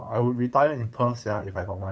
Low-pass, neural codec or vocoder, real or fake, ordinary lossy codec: none; codec, 16 kHz, 8 kbps, FunCodec, trained on LibriTTS, 25 frames a second; fake; none